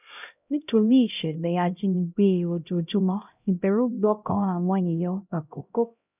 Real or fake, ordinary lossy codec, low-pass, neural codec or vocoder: fake; none; 3.6 kHz; codec, 16 kHz, 0.5 kbps, X-Codec, HuBERT features, trained on LibriSpeech